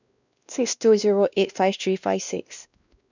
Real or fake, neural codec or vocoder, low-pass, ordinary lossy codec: fake; codec, 16 kHz, 1 kbps, X-Codec, WavLM features, trained on Multilingual LibriSpeech; 7.2 kHz; none